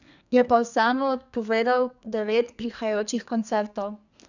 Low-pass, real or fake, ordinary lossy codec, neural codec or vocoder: 7.2 kHz; fake; none; codec, 32 kHz, 1.9 kbps, SNAC